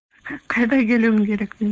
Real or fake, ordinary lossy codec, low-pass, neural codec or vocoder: fake; none; none; codec, 16 kHz, 4.8 kbps, FACodec